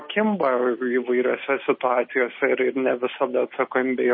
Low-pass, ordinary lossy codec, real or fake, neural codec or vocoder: 7.2 kHz; MP3, 24 kbps; real; none